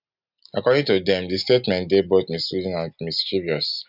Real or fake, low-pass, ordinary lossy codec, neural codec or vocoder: real; 5.4 kHz; none; none